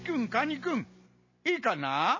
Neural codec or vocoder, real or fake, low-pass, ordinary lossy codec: none; real; 7.2 kHz; MP3, 32 kbps